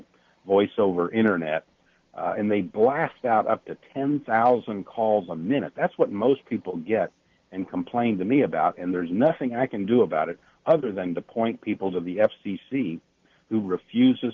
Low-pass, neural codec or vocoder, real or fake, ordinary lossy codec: 7.2 kHz; none; real; Opus, 32 kbps